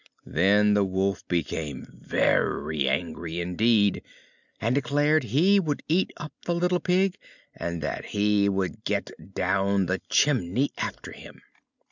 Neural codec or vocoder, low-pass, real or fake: none; 7.2 kHz; real